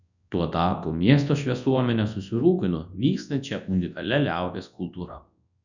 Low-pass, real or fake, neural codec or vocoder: 7.2 kHz; fake; codec, 24 kHz, 0.9 kbps, WavTokenizer, large speech release